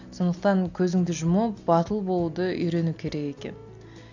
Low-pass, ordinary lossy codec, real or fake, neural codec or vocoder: 7.2 kHz; none; real; none